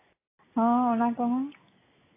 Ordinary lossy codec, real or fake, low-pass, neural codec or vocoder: AAC, 16 kbps; real; 3.6 kHz; none